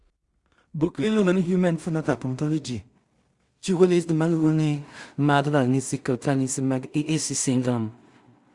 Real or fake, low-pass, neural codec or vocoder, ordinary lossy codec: fake; 10.8 kHz; codec, 16 kHz in and 24 kHz out, 0.4 kbps, LongCat-Audio-Codec, two codebook decoder; Opus, 64 kbps